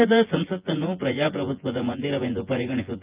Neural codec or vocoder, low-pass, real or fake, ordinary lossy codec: vocoder, 24 kHz, 100 mel bands, Vocos; 3.6 kHz; fake; Opus, 32 kbps